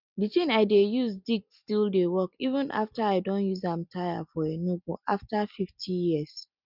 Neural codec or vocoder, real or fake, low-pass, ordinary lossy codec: none; real; 5.4 kHz; none